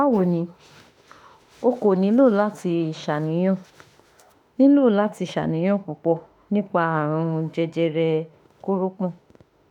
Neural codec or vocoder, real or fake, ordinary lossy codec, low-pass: autoencoder, 48 kHz, 32 numbers a frame, DAC-VAE, trained on Japanese speech; fake; none; 19.8 kHz